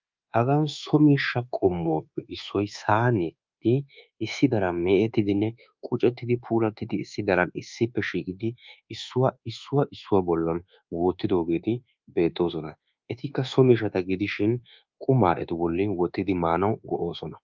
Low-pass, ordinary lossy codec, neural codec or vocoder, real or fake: 7.2 kHz; Opus, 24 kbps; codec, 24 kHz, 1.2 kbps, DualCodec; fake